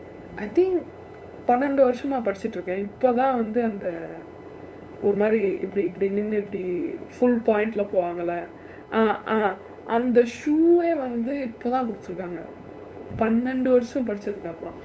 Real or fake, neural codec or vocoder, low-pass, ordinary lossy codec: fake; codec, 16 kHz, 8 kbps, FunCodec, trained on LibriTTS, 25 frames a second; none; none